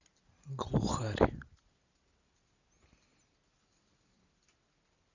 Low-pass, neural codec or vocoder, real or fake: 7.2 kHz; none; real